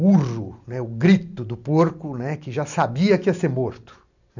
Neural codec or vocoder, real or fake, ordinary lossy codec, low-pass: none; real; none; 7.2 kHz